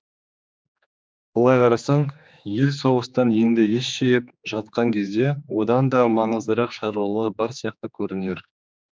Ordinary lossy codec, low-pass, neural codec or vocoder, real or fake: none; none; codec, 16 kHz, 2 kbps, X-Codec, HuBERT features, trained on general audio; fake